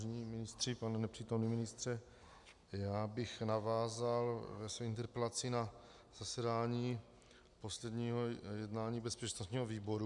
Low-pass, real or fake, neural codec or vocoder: 10.8 kHz; real; none